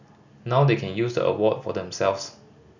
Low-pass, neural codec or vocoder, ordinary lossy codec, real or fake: 7.2 kHz; none; none; real